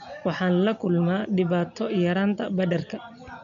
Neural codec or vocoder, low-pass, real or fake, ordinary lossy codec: none; 7.2 kHz; real; none